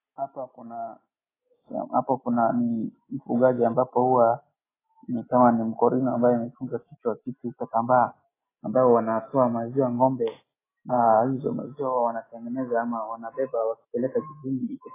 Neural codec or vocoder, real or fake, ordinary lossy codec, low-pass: none; real; AAC, 16 kbps; 3.6 kHz